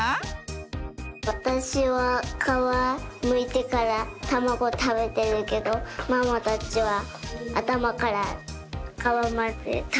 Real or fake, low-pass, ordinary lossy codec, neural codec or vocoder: real; none; none; none